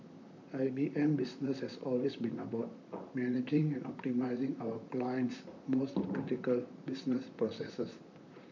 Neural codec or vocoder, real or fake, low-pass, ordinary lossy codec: vocoder, 44.1 kHz, 128 mel bands, Pupu-Vocoder; fake; 7.2 kHz; none